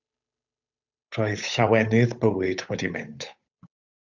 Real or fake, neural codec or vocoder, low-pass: fake; codec, 16 kHz, 8 kbps, FunCodec, trained on Chinese and English, 25 frames a second; 7.2 kHz